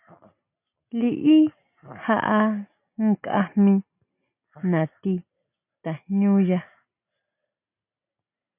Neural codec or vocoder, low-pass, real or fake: none; 3.6 kHz; real